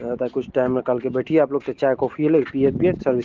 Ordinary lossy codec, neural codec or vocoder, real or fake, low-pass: Opus, 16 kbps; none; real; 7.2 kHz